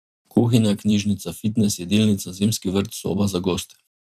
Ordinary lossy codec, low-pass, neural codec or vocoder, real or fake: none; 14.4 kHz; vocoder, 44.1 kHz, 128 mel bands every 512 samples, BigVGAN v2; fake